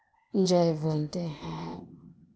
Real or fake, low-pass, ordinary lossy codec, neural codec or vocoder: fake; none; none; codec, 16 kHz, 0.8 kbps, ZipCodec